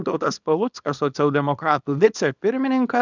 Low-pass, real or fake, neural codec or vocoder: 7.2 kHz; fake; codec, 24 kHz, 0.9 kbps, WavTokenizer, small release